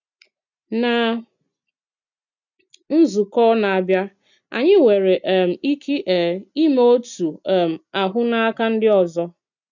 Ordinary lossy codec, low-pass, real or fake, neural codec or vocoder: none; 7.2 kHz; real; none